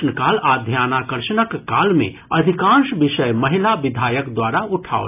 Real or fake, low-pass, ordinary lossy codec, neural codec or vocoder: real; 3.6 kHz; AAC, 32 kbps; none